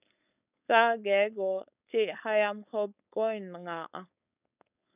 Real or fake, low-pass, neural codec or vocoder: fake; 3.6 kHz; codec, 16 kHz, 4.8 kbps, FACodec